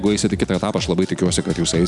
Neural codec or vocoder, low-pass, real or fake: none; 10.8 kHz; real